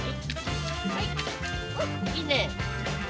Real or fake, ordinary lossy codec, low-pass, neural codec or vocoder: real; none; none; none